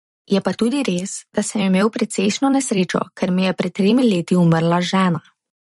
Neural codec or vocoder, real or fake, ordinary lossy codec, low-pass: vocoder, 44.1 kHz, 128 mel bands every 256 samples, BigVGAN v2; fake; MP3, 48 kbps; 19.8 kHz